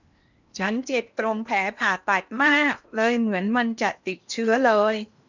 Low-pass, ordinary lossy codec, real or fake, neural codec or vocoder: 7.2 kHz; none; fake; codec, 16 kHz in and 24 kHz out, 0.8 kbps, FocalCodec, streaming, 65536 codes